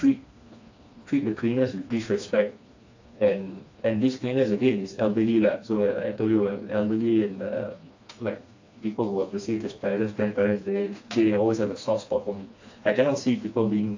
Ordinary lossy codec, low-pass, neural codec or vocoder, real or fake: AAC, 48 kbps; 7.2 kHz; codec, 16 kHz, 2 kbps, FreqCodec, smaller model; fake